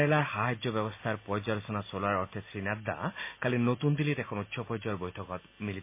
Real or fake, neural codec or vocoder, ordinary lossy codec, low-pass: real; none; MP3, 24 kbps; 3.6 kHz